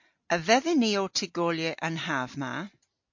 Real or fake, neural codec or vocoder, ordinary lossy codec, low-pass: real; none; MP3, 48 kbps; 7.2 kHz